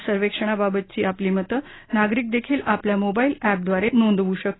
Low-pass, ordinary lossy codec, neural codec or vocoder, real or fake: 7.2 kHz; AAC, 16 kbps; none; real